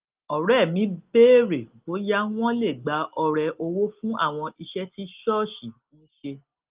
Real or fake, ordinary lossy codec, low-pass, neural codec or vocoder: real; Opus, 32 kbps; 3.6 kHz; none